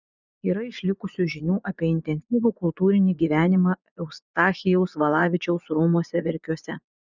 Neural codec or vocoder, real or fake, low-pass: vocoder, 24 kHz, 100 mel bands, Vocos; fake; 7.2 kHz